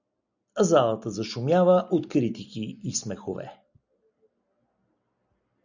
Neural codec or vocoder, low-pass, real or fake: none; 7.2 kHz; real